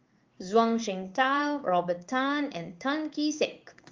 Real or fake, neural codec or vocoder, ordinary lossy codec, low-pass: fake; codec, 16 kHz in and 24 kHz out, 1 kbps, XY-Tokenizer; Opus, 32 kbps; 7.2 kHz